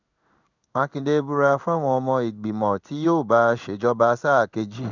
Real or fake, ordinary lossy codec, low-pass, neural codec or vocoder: fake; none; 7.2 kHz; codec, 16 kHz in and 24 kHz out, 1 kbps, XY-Tokenizer